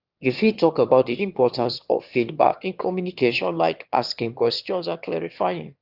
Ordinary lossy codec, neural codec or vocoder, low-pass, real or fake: Opus, 32 kbps; autoencoder, 22.05 kHz, a latent of 192 numbers a frame, VITS, trained on one speaker; 5.4 kHz; fake